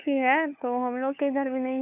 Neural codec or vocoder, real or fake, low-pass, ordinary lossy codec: codec, 16 kHz, 4 kbps, FunCodec, trained on LibriTTS, 50 frames a second; fake; 3.6 kHz; none